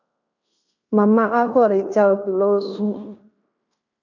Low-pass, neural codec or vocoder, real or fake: 7.2 kHz; codec, 16 kHz in and 24 kHz out, 0.9 kbps, LongCat-Audio-Codec, fine tuned four codebook decoder; fake